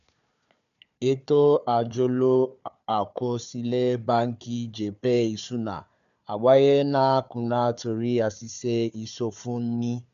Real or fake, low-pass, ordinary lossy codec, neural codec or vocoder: fake; 7.2 kHz; none; codec, 16 kHz, 4 kbps, FunCodec, trained on Chinese and English, 50 frames a second